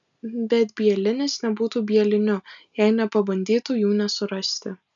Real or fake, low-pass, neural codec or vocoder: real; 7.2 kHz; none